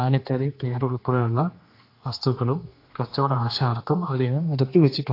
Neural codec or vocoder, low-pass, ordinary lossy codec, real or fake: codec, 16 kHz, 2 kbps, X-Codec, HuBERT features, trained on general audio; 5.4 kHz; AAC, 32 kbps; fake